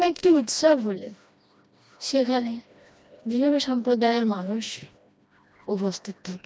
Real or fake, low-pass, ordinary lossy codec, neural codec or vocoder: fake; none; none; codec, 16 kHz, 1 kbps, FreqCodec, smaller model